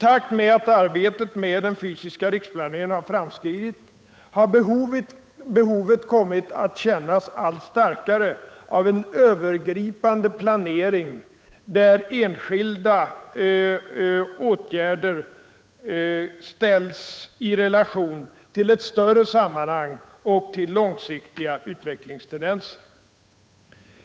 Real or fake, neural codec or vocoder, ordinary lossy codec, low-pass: fake; codec, 16 kHz, 8 kbps, FunCodec, trained on Chinese and English, 25 frames a second; none; none